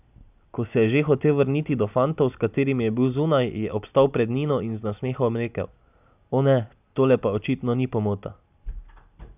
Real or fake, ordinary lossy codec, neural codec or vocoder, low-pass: real; none; none; 3.6 kHz